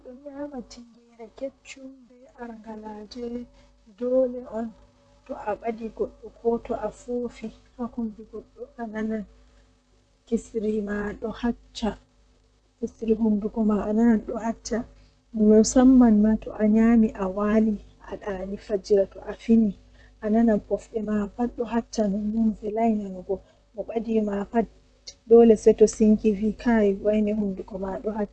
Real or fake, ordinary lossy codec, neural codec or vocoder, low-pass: fake; none; vocoder, 44.1 kHz, 128 mel bands, Pupu-Vocoder; 9.9 kHz